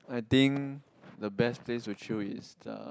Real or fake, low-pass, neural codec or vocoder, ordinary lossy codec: real; none; none; none